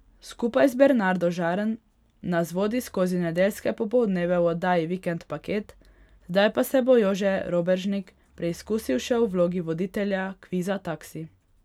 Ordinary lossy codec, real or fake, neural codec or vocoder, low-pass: none; real; none; 19.8 kHz